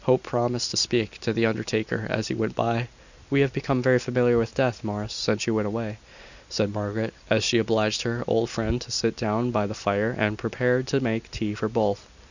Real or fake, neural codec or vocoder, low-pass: real; none; 7.2 kHz